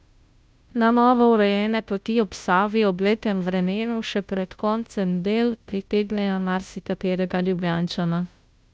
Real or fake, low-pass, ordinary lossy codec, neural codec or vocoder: fake; none; none; codec, 16 kHz, 0.5 kbps, FunCodec, trained on Chinese and English, 25 frames a second